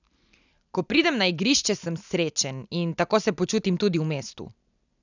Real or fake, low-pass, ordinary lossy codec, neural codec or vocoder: real; 7.2 kHz; none; none